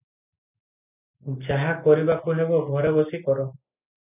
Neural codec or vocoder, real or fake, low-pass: none; real; 3.6 kHz